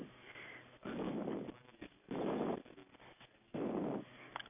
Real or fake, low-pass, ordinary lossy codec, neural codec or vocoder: real; 3.6 kHz; none; none